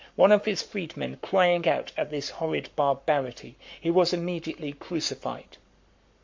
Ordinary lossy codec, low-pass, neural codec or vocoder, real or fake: MP3, 48 kbps; 7.2 kHz; codec, 44.1 kHz, 7.8 kbps, Pupu-Codec; fake